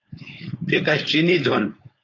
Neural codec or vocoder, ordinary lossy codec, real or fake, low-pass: codec, 16 kHz, 4.8 kbps, FACodec; AAC, 32 kbps; fake; 7.2 kHz